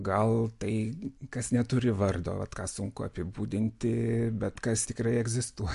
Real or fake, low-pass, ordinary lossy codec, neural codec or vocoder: real; 10.8 kHz; MP3, 64 kbps; none